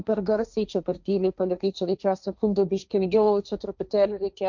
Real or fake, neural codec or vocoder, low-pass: fake; codec, 16 kHz, 1.1 kbps, Voila-Tokenizer; 7.2 kHz